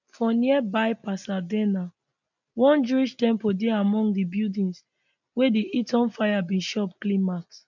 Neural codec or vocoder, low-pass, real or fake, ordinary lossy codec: none; 7.2 kHz; real; none